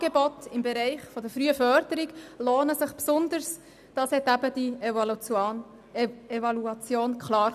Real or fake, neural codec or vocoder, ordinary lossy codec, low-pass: real; none; none; 14.4 kHz